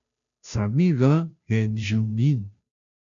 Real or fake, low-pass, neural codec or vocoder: fake; 7.2 kHz; codec, 16 kHz, 0.5 kbps, FunCodec, trained on Chinese and English, 25 frames a second